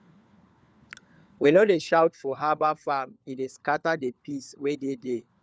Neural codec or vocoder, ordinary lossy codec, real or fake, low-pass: codec, 16 kHz, 4 kbps, FunCodec, trained on LibriTTS, 50 frames a second; none; fake; none